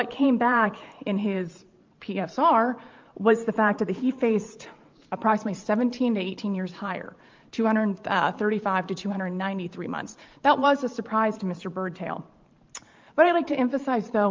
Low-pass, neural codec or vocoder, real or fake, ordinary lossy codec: 7.2 kHz; vocoder, 44.1 kHz, 128 mel bands every 512 samples, BigVGAN v2; fake; Opus, 32 kbps